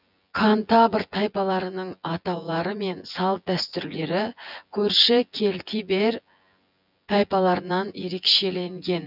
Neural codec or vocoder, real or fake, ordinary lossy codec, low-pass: vocoder, 24 kHz, 100 mel bands, Vocos; fake; none; 5.4 kHz